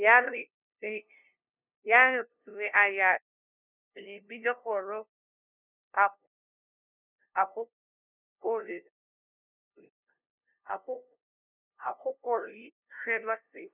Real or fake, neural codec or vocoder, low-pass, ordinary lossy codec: fake; codec, 16 kHz, 0.5 kbps, FunCodec, trained on LibriTTS, 25 frames a second; 3.6 kHz; none